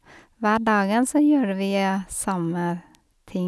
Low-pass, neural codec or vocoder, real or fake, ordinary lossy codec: none; none; real; none